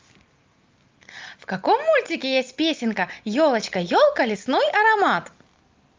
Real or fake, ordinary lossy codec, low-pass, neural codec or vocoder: real; Opus, 24 kbps; 7.2 kHz; none